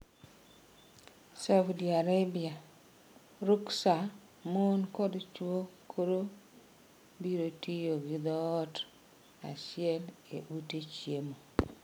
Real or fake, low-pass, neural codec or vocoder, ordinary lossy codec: real; none; none; none